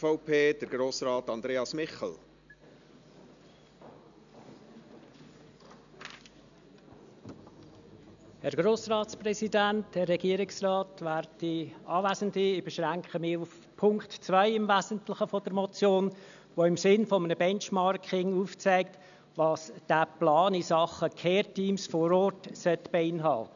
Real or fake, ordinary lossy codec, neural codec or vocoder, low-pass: real; none; none; 7.2 kHz